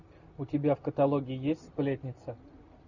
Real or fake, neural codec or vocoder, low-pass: real; none; 7.2 kHz